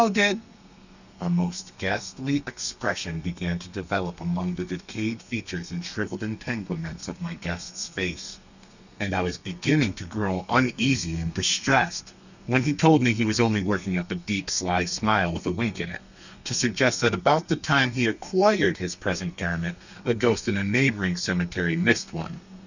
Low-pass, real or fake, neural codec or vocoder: 7.2 kHz; fake; codec, 32 kHz, 1.9 kbps, SNAC